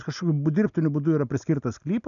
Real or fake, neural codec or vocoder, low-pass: real; none; 7.2 kHz